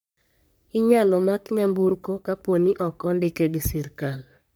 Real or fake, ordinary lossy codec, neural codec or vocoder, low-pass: fake; none; codec, 44.1 kHz, 3.4 kbps, Pupu-Codec; none